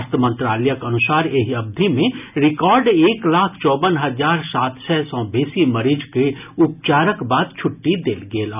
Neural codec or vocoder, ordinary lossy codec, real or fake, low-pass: none; none; real; 3.6 kHz